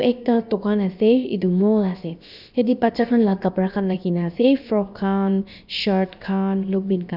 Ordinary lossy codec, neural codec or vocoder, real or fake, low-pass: none; codec, 16 kHz, about 1 kbps, DyCAST, with the encoder's durations; fake; 5.4 kHz